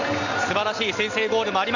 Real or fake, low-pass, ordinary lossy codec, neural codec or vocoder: real; 7.2 kHz; none; none